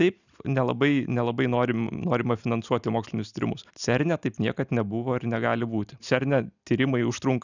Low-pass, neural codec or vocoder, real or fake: 7.2 kHz; none; real